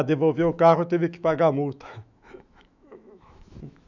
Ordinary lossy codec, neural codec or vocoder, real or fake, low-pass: none; autoencoder, 48 kHz, 128 numbers a frame, DAC-VAE, trained on Japanese speech; fake; 7.2 kHz